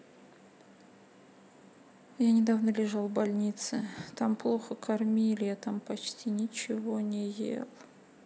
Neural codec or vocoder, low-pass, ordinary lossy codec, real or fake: none; none; none; real